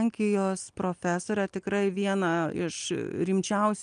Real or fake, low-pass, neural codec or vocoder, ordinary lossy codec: real; 9.9 kHz; none; Opus, 32 kbps